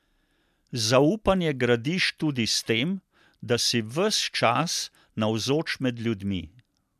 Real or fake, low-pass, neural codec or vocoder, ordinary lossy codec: real; 14.4 kHz; none; MP3, 96 kbps